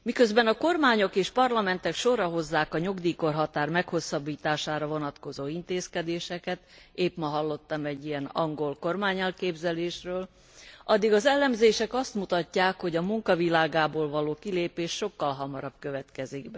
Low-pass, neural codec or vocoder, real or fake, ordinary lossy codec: none; none; real; none